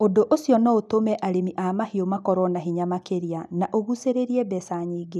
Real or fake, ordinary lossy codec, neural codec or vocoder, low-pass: real; none; none; none